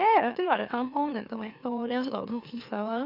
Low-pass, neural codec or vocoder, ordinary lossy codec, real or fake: 5.4 kHz; autoencoder, 44.1 kHz, a latent of 192 numbers a frame, MeloTTS; none; fake